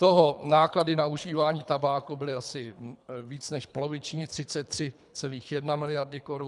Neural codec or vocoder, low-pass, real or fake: codec, 24 kHz, 3 kbps, HILCodec; 10.8 kHz; fake